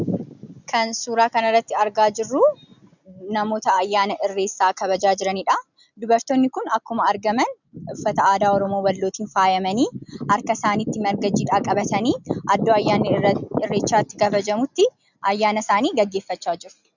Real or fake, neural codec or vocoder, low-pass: real; none; 7.2 kHz